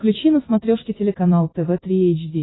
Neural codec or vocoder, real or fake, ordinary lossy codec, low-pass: none; real; AAC, 16 kbps; 7.2 kHz